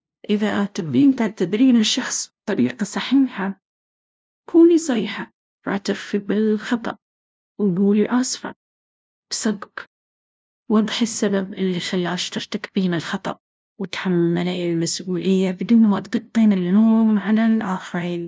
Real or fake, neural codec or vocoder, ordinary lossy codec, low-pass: fake; codec, 16 kHz, 0.5 kbps, FunCodec, trained on LibriTTS, 25 frames a second; none; none